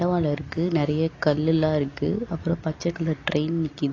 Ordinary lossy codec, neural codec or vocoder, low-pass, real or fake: AAC, 32 kbps; none; 7.2 kHz; real